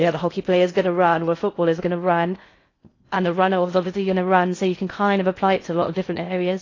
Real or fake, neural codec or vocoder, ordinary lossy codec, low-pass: fake; codec, 16 kHz in and 24 kHz out, 0.6 kbps, FocalCodec, streaming, 2048 codes; AAC, 32 kbps; 7.2 kHz